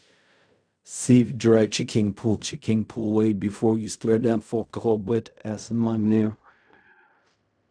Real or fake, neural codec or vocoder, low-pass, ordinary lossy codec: fake; codec, 16 kHz in and 24 kHz out, 0.4 kbps, LongCat-Audio-Codec, fine tuned four codebook decoder; 9.9 kHz; none